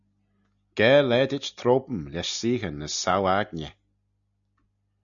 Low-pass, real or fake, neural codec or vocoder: 7.2 kHz; real; none